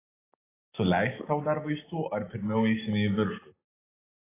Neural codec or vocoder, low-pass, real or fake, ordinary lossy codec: none; 3.6 kHz; real; AAC, 16 kbps